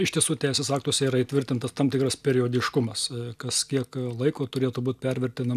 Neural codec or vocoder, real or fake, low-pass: none; real; 14.4 kHz